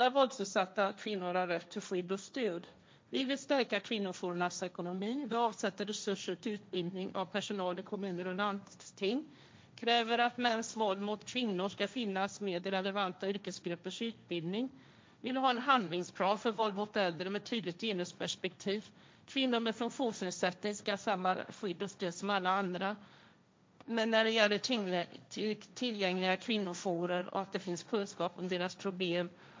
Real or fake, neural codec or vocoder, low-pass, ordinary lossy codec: fake; codec, 16 kHz, 1.1 kbps, Voila-Tokenizer; none; none